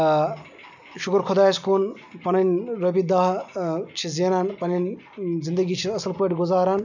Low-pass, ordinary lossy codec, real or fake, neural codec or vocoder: 7.2 kHz; none; real; none